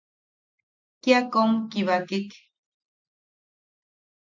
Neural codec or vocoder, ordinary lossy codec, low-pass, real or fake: none; MP3, 64 kbps; 7.2 kHz; real